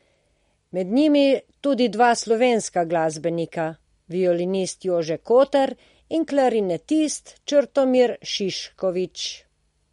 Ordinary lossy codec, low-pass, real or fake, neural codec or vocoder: MP3, 48 kbps; 19.8 kHz; real; none